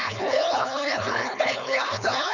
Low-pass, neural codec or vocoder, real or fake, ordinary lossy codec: 7.2 kHz; codec, 16 kHz, 4.8 kbps, FACodec; fake; none